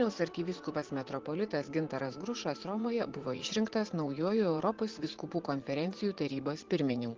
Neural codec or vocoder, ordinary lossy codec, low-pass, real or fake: vocoder, 44.1 kHz, 80 mel bands, Vocos; Opus, 16 kbps; 7.2 kHz; fake